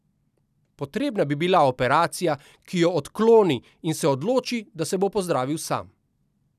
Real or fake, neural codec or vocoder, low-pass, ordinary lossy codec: real; none; 14.4 kHz; none